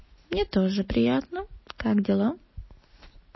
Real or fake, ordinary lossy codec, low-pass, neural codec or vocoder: real; MP3, 24 kbps; 7.2 kHz; none